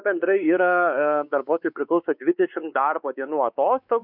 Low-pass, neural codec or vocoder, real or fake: 5.4 kHz; codec, 16 kHz, 4 kbps, X-Codec, WavLM features, trained on Multilingual LibriSpeech; fake